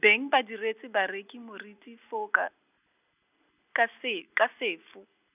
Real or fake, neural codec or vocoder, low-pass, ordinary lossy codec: real; none; 3.6 kHz; none